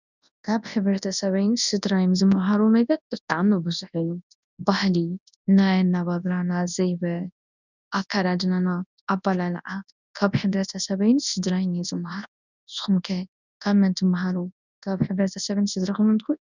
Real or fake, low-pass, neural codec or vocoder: fake; 7.2 kHz; codec, 24 kHz, 0.9 kbps, WavTokenizer, large speech release